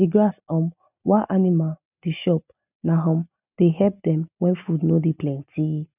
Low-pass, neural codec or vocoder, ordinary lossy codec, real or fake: 3.6 kHz; none; none; real